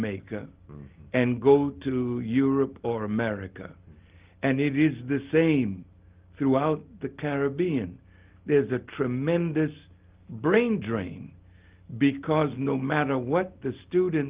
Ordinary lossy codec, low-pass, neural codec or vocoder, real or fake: Opus, 24 kbps; 3.6 kHz; none; real